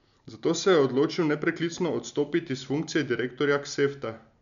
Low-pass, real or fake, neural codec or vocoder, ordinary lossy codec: 7.2 kHz; real; none; none